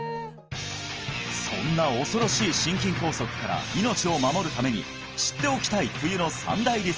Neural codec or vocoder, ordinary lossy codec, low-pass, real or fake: none; Opus, 24 kbps; 7.2 kHz; real